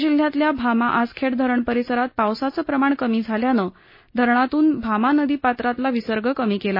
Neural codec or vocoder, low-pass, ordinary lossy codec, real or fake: none; 5.4 kHz; MP3, 24 kbps; real